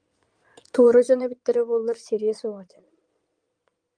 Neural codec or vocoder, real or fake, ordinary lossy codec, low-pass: codec, 16 kHz in and 24 kHz out, 2.2 kbps, FireRedTTS-2 codec; fake; Opus, 32 kbps; 9.9 kHz